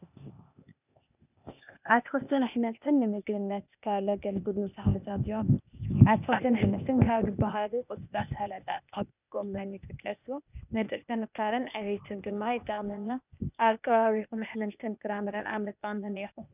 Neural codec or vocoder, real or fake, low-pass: codec, 16 kHz, 0.8 kbps, ZipCodec; fake; 3.6 kHz